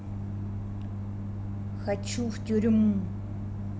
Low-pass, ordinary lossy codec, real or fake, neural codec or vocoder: none; none; real; none